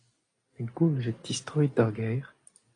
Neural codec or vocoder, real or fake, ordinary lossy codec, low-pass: none; real; AAC, 32 kbps; 9.9 kHz